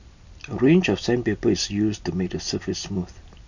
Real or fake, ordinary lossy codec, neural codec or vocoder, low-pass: real; none; none; 7.2 kHz